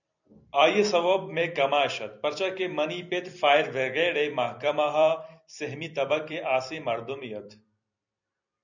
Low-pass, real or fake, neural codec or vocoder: 7.2 kHz; fake; vocoder, 44.1 kHz, 128 mel bands every 256 samples, BigVGAN v2